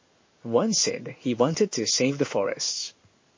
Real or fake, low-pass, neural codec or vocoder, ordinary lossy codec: fake; 7.2 kHz; codec, 16 kHz in and 24 kHz out, 1 kbps, XY-Tokenizer; MP3, 32 kbps